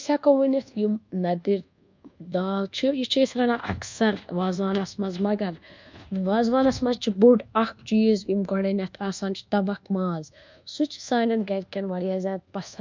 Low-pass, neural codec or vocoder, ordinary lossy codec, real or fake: 7.2 kHz; codec, 24 kHz, 1.2 kbps, DualCodec; MP3, 64 kbps; fake